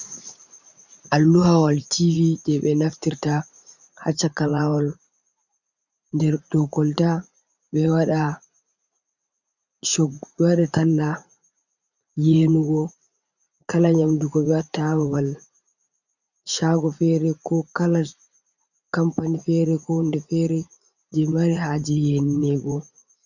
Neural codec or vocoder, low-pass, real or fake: vocoder, 44.1 kHz, 80 mel bands, Vocos; 7.2 kHz; fake